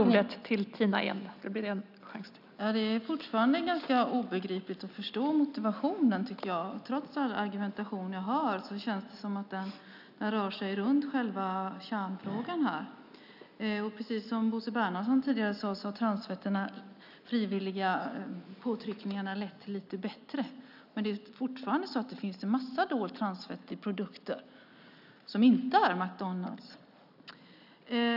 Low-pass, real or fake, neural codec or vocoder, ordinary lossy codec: 5.4 kHz; real; none; none